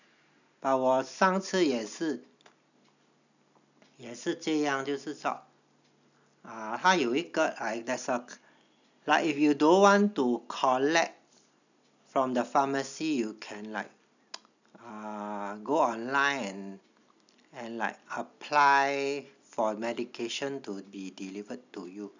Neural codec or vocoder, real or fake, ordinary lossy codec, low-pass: none; real; none; 7.2 kHz